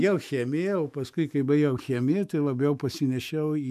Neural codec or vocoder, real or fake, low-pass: autoencoder, 48 kHz, 128 numbers a frame, DAC-VAE, trained on Japanese speech; fake; 14.4 kHz